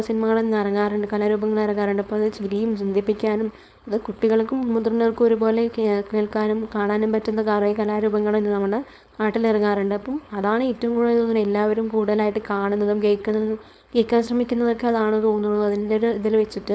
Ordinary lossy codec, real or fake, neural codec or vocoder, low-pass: none; fake; codec, 16 kHz, 4.8 kbps, FACodec; none